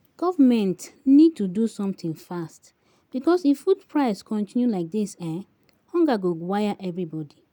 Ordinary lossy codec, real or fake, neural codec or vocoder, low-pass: none; real; none; none